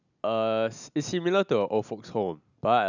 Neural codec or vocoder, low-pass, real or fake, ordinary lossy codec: none; 7.2 kHz; real; none